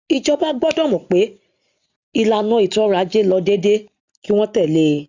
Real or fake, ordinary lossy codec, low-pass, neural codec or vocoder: real; Opus, 64 kbps; 7.2 kHz; none